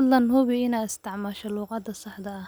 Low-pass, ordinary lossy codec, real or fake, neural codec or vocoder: none; none; real; none